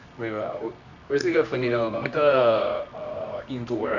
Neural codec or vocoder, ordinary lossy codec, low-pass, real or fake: codec, 24 kHz, 0.9 kbps, WavTokenizer, medium music audio release; none; 7.2 kHz; fake